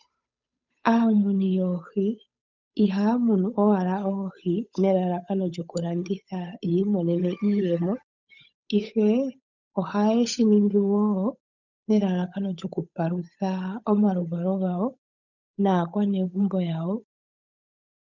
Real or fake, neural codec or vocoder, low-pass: fake; codec, 16 kHz, 8 kbps, FunCodec, trained on Chinese and English, 25 frames a second; 7.2 kHz